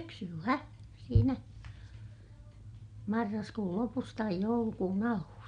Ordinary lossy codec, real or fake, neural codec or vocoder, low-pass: MP3, 64 kbps; real; none; 9.9 kHz